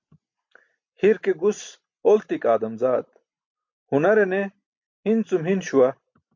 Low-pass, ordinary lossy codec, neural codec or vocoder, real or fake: 7.2 kHz; MP3, 48 kbps; none; real